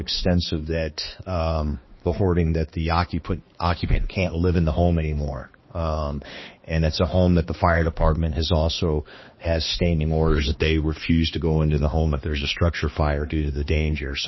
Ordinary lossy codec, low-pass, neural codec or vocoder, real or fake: MP3, 24 kbps; 7.2 kHz; codec, 16 kHz, 2 kbps, X-Codec, HuBERT features, trained on balanced general audio; fake